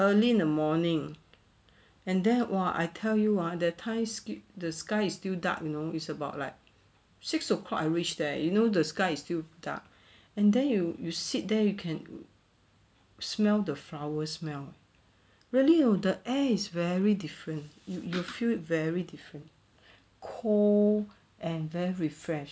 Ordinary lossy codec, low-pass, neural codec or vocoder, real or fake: none; none; none; real